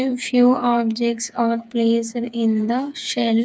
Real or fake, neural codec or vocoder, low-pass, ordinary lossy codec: fake; codec, 16 kHz, 4 kbps, FreqCodec, smaller model; none; none